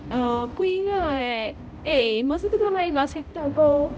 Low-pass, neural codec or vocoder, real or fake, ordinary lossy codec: none; codec, 16 kHz, 0.5 kbps, X-Codec, HuBERT features, trained on balanced general audio; fake; none